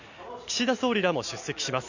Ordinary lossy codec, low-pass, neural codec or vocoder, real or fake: none; 7.2 kHz; none; real